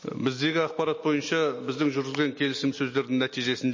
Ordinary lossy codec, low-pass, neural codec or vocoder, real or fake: MP3, 32 kbps; 7.2 kHz; none; real